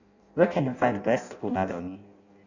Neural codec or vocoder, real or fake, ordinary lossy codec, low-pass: codec, 16 kHz in and 24 kHz out, 0.6 kbps, FireRedTTS-2 codec; fake; none; 7.2 kHz